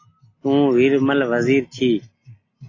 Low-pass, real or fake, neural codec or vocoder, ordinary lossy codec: 7.2 kHz; real; none; AAC, 32 kbps